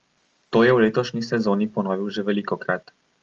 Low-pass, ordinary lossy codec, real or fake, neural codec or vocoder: 7.2 kHz; Opus, 24 kbps; real; none